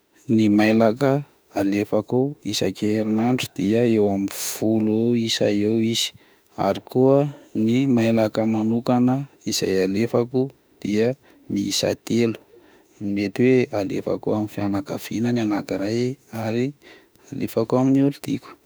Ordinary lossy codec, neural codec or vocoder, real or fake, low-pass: none; autoencoder, 48 kHz, 32 numbers a frame, DAC-VAE, trained on Japanese speech; fake; none